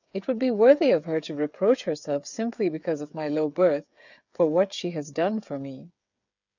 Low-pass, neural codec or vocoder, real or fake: 7.2 kHz; codec, 16 kHz, 8 kbps, FreqCodec, smaller model; fake